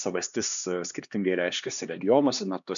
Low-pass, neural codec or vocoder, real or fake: 7.2 kHz; codec, 16 kHz, 2 kbps, X-Codec, HuBERT features, trained on LibriSpeech; fake